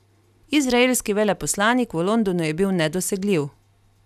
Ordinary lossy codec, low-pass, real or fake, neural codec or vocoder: none; 14.4 kHz; real; none